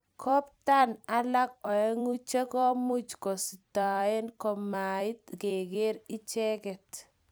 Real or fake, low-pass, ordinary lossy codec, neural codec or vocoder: fake; none; none; vocoder, 44.1 kHz, 128 mel bands every 256 samples, BigVGAN v2